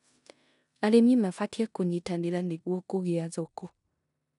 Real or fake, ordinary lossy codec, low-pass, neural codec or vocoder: fake; none; 10.8 kHz; codec, 16 kHz in and 24 kHz out, 0.9 kbps, LongCat-Audio-Codec, four codebook decoder